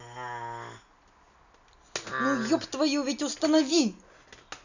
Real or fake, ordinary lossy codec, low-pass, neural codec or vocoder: real; none; 7.2 kHz; none